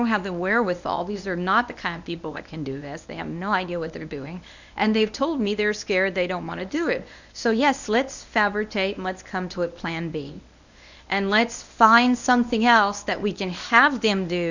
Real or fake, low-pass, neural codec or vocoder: fake; 7.2 kHz; codec, 24 kHz, 0.9 kbps, WavTokenizer, medium speech release version 1